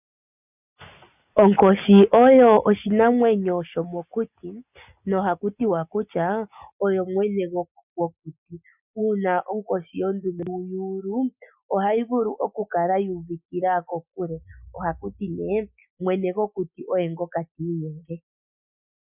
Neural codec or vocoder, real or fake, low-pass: none; real; 3.6 kHz